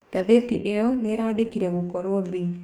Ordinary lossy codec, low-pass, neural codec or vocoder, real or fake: none; 19.8 kHz; codec, 44.1 kHz, 2.6 kbps, DAC; fake